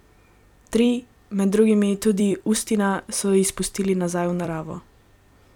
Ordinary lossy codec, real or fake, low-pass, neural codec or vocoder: none; fake; 19.8 kHz; vocoder, 44.1 kHz, 128 mel bands every 256 samples, BigVGAN v2